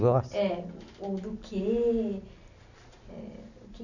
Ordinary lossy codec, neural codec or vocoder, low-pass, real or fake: none; none; 7.2 kHz; real